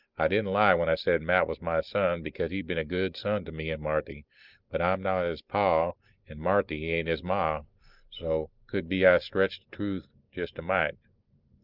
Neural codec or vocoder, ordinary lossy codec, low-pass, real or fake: none; Opus, 24 kbps; 5.4 kHz; real